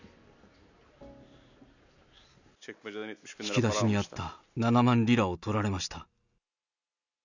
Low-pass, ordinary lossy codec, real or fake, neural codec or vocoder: 7.2 kHz; none; real; none